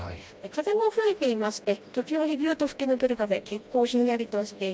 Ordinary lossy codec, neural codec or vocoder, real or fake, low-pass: none; codec, 16 kHz, 1 kbps, FreqCodec, smaller model; fake; none